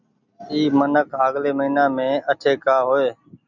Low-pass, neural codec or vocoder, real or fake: 7.2 kHz; none; real